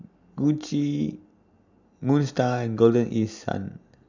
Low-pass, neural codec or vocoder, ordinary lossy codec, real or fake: 7.2 kHz; none; none; real